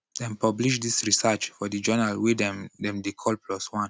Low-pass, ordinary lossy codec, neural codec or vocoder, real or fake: none; none; none; real